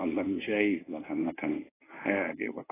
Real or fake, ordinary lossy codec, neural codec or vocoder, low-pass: fake; AAC, 16 kbps; codec, 24 kHz, 0.9 kbps, WavTokenizer, medium speech release version 1; 3.6 kHz